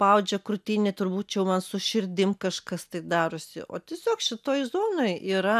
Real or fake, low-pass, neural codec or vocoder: real; 14.4 kHz; none